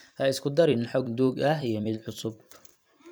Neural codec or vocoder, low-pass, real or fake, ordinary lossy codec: vocoder, 44.1 kHz, 128 mel bands every 256 samples, BigVGAN v2; none; fake; none